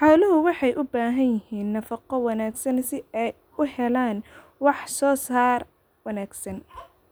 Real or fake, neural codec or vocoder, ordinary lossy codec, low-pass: real; none; none; none